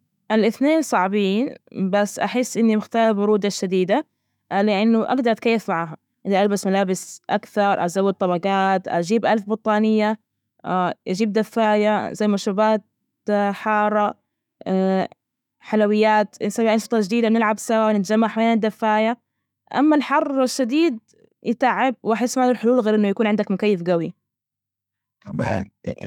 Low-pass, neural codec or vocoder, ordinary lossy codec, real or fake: 19.8 kHz; autoencoder, 48 kHz, 128 numbers a frame, DAC-VAE, trained on Japanese speech; none; fake